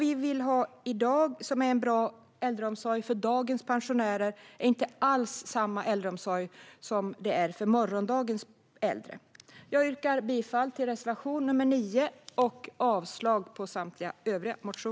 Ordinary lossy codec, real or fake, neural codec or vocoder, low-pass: none; real; none; none